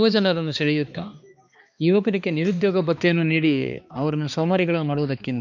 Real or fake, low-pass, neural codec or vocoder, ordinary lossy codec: fake; 7.2 kHz; codec, 16 kHz, 2 kbps, X-Codec, HuBERT features, trained on balanced general audio; none